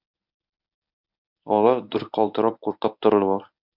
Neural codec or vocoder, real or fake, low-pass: codec, 24 kHz, 0.9 kbps, WavTokenizer, medium speech release version 1; fake; 5.4 kHz